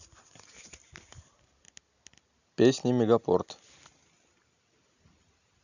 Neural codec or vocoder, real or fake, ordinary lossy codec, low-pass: none; real; none; 7.2 kHz